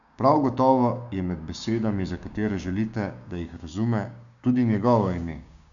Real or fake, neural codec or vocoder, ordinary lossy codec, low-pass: fake; codec, 16 kHz, 6 kbps, DAC; none; 7.2 kHz